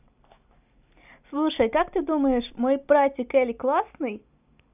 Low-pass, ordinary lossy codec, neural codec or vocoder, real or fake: 3.6 kHz; none; none; real